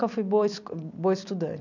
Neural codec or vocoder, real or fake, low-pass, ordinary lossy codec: vocoder, 44.1 kHz, 128 mel bands every 256 samples, BigVGAN v2; fake; 7.2 kHz; none